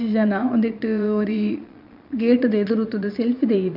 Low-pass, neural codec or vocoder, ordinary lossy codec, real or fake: 5.4 kHz; vocoder, 22.05 kHz, 80 mel bands, Vocos; none; fake